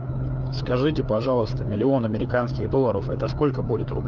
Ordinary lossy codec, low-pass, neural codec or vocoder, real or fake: Opus, 32 kbps; 7.2 kHz; codec, 16 kHz, 4 kbps, FreqCodec, larger model; fake